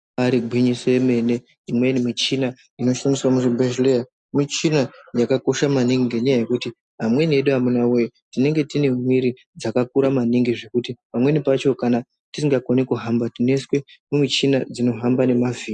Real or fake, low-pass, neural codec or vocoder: real; 10.8 kHz; none